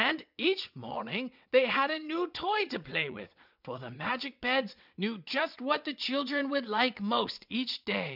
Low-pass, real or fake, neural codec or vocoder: 5.4 kHz; fake; vocoder, 22.05 kHz, 80 mel bands, WaveNeXt